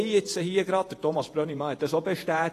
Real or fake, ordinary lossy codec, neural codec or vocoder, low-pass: fake; AAC, 48 kbps; vocoder, 48 kHz, 128 mel bands, Vocos; 14.4 kHz